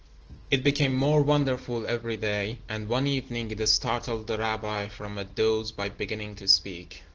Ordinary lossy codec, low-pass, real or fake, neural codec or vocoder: Opus, 16 kbps; 7.2 kHz; real; none